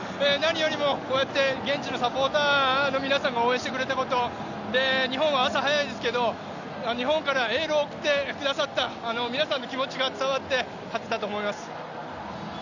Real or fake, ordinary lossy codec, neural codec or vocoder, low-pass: real; none; none; 7.2 kHz